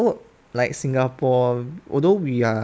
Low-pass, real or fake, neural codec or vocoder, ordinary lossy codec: none; real; none; none